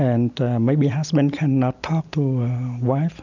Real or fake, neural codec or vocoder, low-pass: real; none; 7.2 kHz